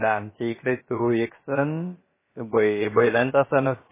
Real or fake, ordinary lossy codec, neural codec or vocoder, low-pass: fake; MP3, 16 kbps; codec, 16 kHz, about 1 kbps, DyCAST, with the encoder's durations; 3.6 kHz